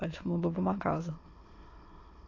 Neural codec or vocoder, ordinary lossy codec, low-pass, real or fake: autoencoder, 22.05 kHz, a latent of 192 numbers a frame, VITS, trained on many speakers; AAC, 32 kbps; 7.2 kHz; fake